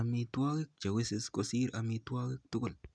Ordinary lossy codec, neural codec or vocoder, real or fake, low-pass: none; none; real; 9.9 kHz